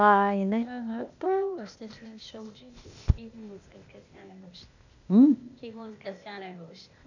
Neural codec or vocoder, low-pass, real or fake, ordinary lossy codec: codec, 16 kHz, 0.8 kbps, ZipCodec; 7.2 kHz; fake; none